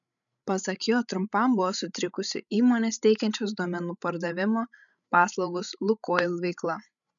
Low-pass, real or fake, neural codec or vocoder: 7.2 kHz; fake; codec, 16 kHz, 16 kbps, FreqCodec, larger model